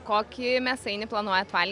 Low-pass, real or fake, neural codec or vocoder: 10.8 kHz; real; none